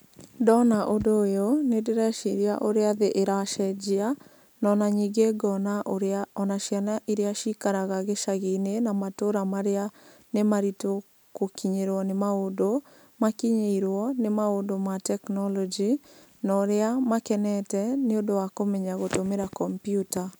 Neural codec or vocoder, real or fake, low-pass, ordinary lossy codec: none; real; none; none